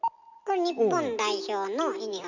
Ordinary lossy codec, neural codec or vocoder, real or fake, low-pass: none; none; real; 7.2 kHz